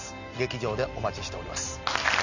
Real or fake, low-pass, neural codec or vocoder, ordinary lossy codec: real; 7.2 kHz; none; none